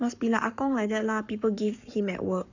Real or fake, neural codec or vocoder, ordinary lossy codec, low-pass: fake; codec, 44.1 kHz, 7.8 kbps, Pupu-Codec; none; 7.2 kHz